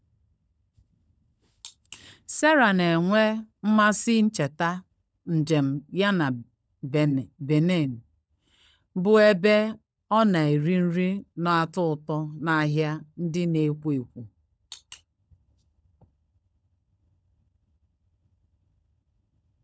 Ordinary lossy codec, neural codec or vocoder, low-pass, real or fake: none; codec, 16 kHz, 16 kbps, FunCodec, trained on LibriTTS, 50 frames a second; none; fake